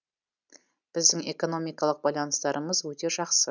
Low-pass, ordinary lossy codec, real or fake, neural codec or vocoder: 7.2 kHz; none; real; none